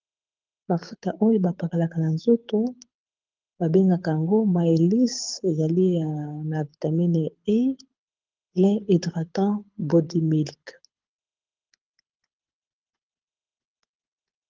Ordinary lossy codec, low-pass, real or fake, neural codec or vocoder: Opus, 32 kbps; 7.2 kHz; fake; codec, 24 kHz, 6 kbps, HILCodec